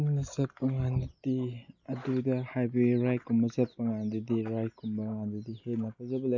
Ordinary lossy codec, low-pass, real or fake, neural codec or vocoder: none; 7.2 kHz; real; none